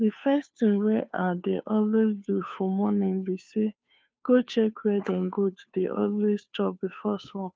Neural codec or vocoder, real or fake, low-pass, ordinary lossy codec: codec, 16 kHz, 2 kbps, FunCodec, trained on Chinese and English, 25 frames a second; fake; none; none